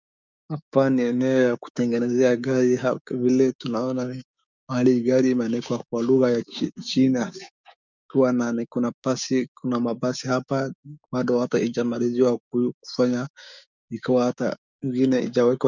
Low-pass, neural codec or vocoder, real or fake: 7.2 kHz; codec, 16 kHz, 6 kbps, DAC; fake